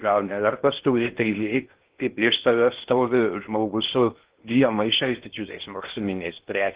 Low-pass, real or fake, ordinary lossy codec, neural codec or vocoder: 3.6 kHz; fake; Opus, 16 kbps; codec, 16 kHz in and 24 kHz out, 0.6 kbps, FocalCodec, streaming, 2048 codes